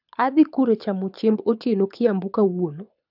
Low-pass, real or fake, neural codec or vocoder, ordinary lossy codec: 5.4 kHz; fake; codec, 24 kHz, 6 kbps, HILCodec; none